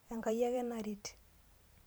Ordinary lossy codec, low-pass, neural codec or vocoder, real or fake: none; none; vocoder, 44.1 kHz, 128 mel bands every 512 samples, BigVGAN v2; fake